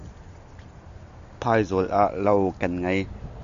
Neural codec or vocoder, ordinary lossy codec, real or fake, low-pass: none; MP3, 64 kbps; real; 7.2 kHz